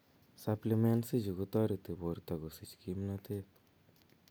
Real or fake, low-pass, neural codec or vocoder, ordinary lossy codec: real; none; none; none